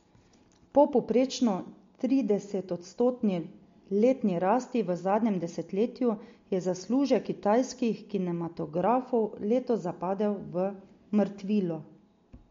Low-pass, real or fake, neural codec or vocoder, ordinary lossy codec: 7.2 kHz; real; none; MP3, 48 kbps